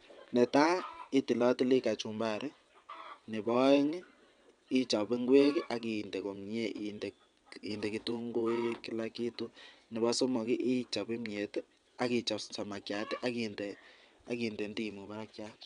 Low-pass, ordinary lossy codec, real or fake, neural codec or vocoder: 9.9 kHz; none; fake; vocoder, 22.05 kHz, 80 mel bands, WaveNeXt